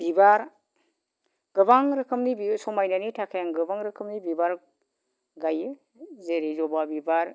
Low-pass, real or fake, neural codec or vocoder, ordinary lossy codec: none; real; none; none